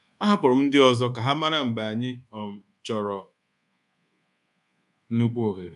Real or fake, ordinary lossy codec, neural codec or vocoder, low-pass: fake; none; codec, 24 kHz, 1.2 kbps, DualCodec; 10.8 kHz